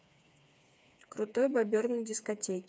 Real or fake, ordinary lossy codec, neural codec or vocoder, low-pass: fake; none; codec, 16 kHz, 4 kbps, FreqCodec, smaller model; none